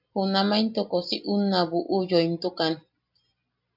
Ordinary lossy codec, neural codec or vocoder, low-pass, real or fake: AAC, 48 kbps; none; 5.4 kHz; real